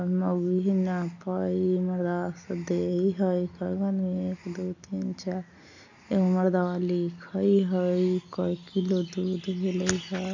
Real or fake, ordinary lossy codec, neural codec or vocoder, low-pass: real; AAC, 48 kbps; none; 7.2 kHz